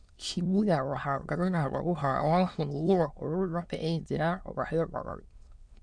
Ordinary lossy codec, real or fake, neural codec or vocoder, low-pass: none; fake; autoencoder, 22.05 kHz, a latent of 192 numbers a frame, VITS, trained on many speakers; 9.9 kHz